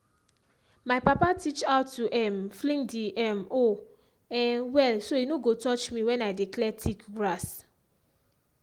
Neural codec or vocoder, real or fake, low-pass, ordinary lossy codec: none; real; 19.8 kHz; Opus, 24 kbps